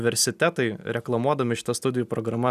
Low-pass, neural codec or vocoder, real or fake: 14.4 kHz; none; real